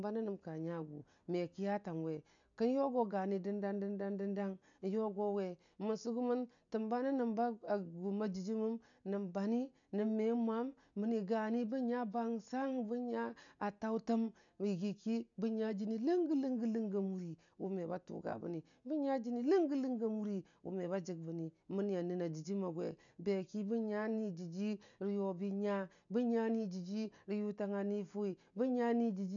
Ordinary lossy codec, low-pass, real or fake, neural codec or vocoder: none; 7.2 kHz; real; none